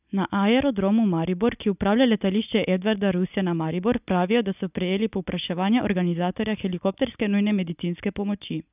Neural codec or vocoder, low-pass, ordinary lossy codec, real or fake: none; 3.6 kHz; none; real